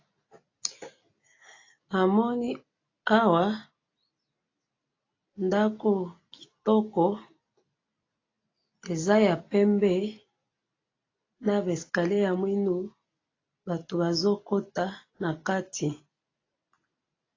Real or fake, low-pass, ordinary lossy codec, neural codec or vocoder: real; 7.2 kHz; AAC, 32 kbps; none